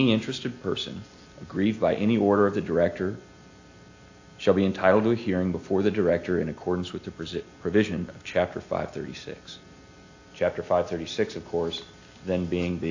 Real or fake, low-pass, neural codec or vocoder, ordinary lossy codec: real; 7.2 kHz; none; AAC, 48 kbps